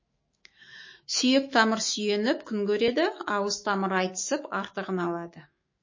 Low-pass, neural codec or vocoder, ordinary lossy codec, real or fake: 7.2 kHz; autoencoder, 48 kHz, 128 numbers a frame, DAC-VAE, trained on Japanese speech; MP3, 32 kbps; fake